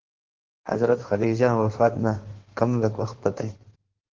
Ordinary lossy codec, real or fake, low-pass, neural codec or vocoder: Opus, 16 kbps; fake; 7.2 kHz; codec, 16 kHz in and 24 kHz out, 1.1 kbps, FireRedTTS-2 codec